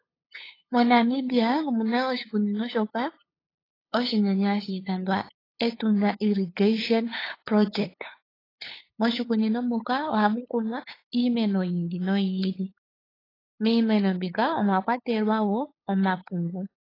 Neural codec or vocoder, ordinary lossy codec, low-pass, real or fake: codec, 16 kHz, 8 kbps, FunCodec, trained on LibriTTS, 25 frames a second; AAC, 24 kbps; 5.4 kHz; fake